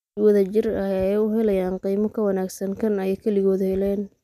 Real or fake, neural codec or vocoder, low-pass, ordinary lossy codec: real; none; 14.4 kHz; none